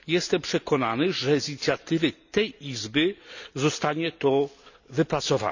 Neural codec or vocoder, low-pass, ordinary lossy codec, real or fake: none; 7.2 kHz; none; real